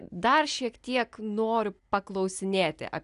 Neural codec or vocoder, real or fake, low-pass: none; real; 10.8 kHz